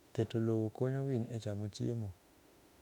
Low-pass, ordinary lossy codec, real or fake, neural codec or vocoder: 19.8 kHz; none; fake; autoencoder, 48 kHz, 32 numbers a frame, DAC-VAE, trained on Japanese speech